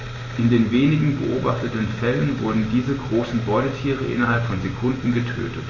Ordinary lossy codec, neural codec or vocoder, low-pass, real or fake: MP3, 32 kbps; none; 7.2 kHz; real